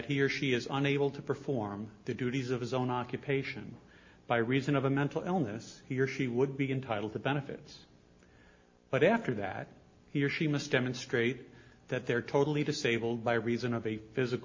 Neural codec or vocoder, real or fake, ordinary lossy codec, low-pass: none; real; MP3, 32 kbps; 7.2 kHz